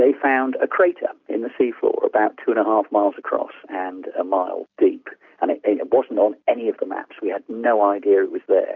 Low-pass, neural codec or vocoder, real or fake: 7.2 kHz; none; real